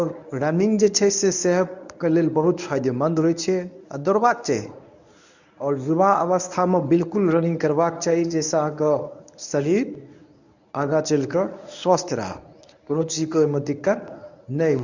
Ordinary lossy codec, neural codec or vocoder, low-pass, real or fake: none; codec, 24 kHz, 0.9 kbps, WavTokenizer, medium speech release version 1; 7.2 kHz; fake